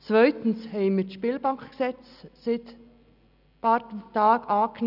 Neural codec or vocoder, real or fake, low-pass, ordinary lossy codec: none; real; 5.4 kHz; none